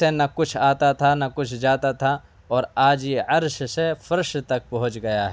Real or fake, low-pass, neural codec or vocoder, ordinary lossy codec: real; none; none; none